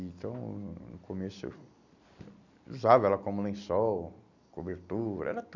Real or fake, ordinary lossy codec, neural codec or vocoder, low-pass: real; none; none; 7.2 kHz